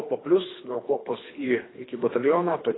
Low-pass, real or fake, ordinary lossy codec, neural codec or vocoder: 7.2 kHz; fake; AAC, 16 kbps; codec, 24 kHz, 3 kbps, HILCodec